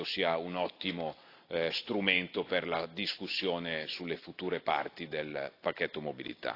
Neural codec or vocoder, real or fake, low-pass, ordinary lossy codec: none; real; 5.4 kHz; AAC, 32 kbps